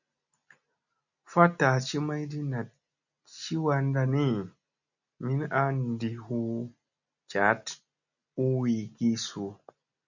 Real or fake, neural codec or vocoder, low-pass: real; none; 7.2 kHz